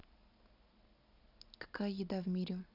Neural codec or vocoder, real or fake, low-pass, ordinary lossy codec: none; real; 5.4 kHz; none